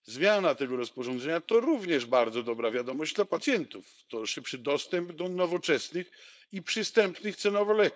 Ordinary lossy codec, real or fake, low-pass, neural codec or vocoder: none; fake; none; codec, 16 kHz, 4.8 kbps, FACodec